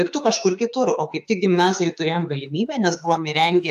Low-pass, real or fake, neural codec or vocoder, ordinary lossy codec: 14.4 kHz; fake; autoencoder, 48 kHz, 32 numbers a frame, DAC-VAE, trained on Japanese speech; AAC, 96 kbps